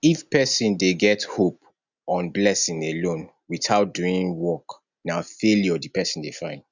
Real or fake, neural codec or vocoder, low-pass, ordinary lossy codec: real; none; 7.2 kHz; none